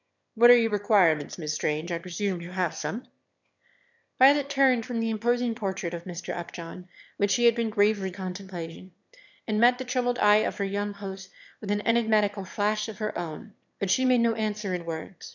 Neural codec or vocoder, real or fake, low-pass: autoencoder, 22.05 kHz, a latent of 192 numbers a frame, VITS, trained on one speaker; fake; 7.2 kHz